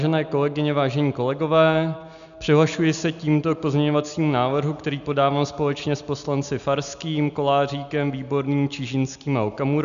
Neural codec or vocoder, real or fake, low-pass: none; real; 7.2 kHz